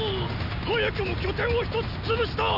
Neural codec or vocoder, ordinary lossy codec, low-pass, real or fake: none; none; 5.4 kHz; real